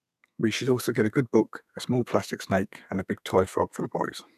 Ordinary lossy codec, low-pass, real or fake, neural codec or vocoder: none; 14.4 kHz; fake; codec, 32 kHz, 1.9 kbps, SNAC